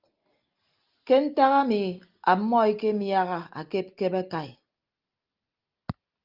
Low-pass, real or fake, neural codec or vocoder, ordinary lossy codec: 5.4 kHz; real; none; Opus, 24 kbps